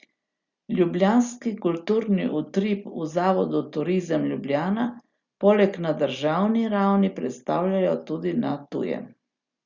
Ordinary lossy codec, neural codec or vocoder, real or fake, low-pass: Opus, 64 kbps; none; real; 7.2 kHz